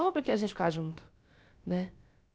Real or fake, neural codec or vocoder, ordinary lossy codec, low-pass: fake; codec, 16 kHz, about 1 kbps, DyCAST, with the encoder's durations; none; none